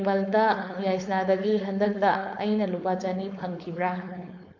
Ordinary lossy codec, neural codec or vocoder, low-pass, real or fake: none; codec, 16 kHz, 4.8 kbps, FACodec; 7.2 kHz; fake